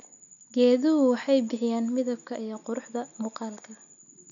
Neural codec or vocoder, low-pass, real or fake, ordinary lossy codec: none; 7.2 kHz; real; none